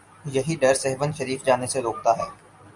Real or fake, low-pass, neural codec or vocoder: real; 10.8 kHz; none